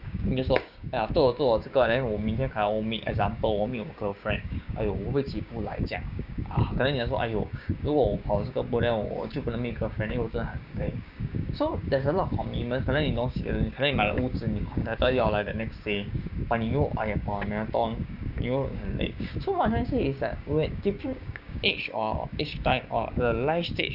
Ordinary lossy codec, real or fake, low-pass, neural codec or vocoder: none; fake; 5.4 kHz; codec, 16 kHz, 6 kbps, DAC